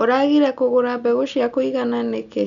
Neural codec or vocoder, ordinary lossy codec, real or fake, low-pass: none; none; real; 7.2 kHz